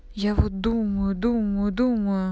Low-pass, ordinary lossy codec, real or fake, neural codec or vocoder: none; none; real; none